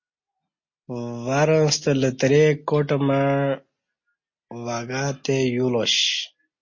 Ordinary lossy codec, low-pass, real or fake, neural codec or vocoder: MP3, 32 kbps; 7.2 kHz; real; none